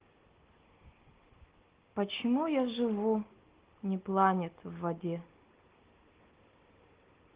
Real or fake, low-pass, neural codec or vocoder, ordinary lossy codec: real; 3.6 kHz; none; Opus, 16 kbps